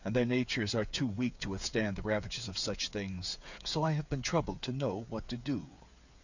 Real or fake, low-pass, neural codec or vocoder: fake; 7.2 kHz; codec, 16 kHz, 8 kbps, FreqCodec, smaller model